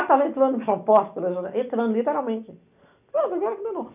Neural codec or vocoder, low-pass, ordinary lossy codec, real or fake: none; 3.6 kHz; none; real